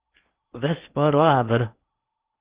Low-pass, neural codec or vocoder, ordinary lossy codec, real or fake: 3.6 kHz; codec, 16 kHz in and 24 kHz out, 0.8 kbps, FocalCodec, streaming, 65536 codes; Opus, 32 kbps; fake